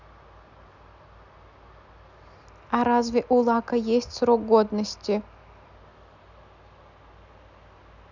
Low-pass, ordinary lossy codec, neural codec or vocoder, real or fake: 7.2 kHz; none; none; real